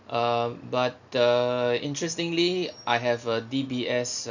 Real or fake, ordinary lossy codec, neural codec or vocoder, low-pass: real; none; none; 7.2 kHz